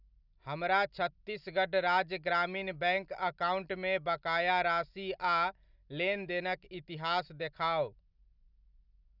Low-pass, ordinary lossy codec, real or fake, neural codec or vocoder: 5.4 kHz; none; real; none